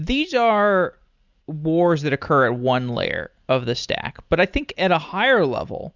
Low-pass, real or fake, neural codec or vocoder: 7.2 kHz; real; none